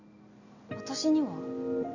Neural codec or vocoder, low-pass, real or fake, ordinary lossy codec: none; 7.2 kHz; real; none